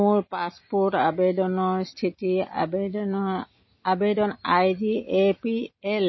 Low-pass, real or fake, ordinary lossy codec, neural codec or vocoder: 7.2 kHz; real; MP3, 24 kbps; none